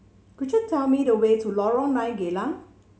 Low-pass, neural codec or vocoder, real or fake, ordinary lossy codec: none; none; real; none